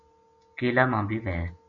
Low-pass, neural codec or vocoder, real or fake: 7.2 kHz; none; real